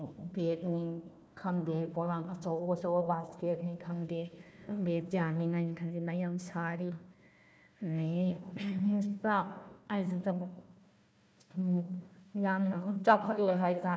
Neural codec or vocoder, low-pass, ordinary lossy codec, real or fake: codec, 16 kHz, 1 kbps, FunCodec, trained on Chinese and English, 50 frames a second; none; none; fake